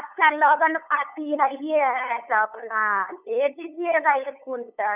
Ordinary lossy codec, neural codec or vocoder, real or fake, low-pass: none; codec, 16 kHz, 8 kbps, FunCodec, trained on LibriTTS, 25 frames a second; fake; 3.6 kHz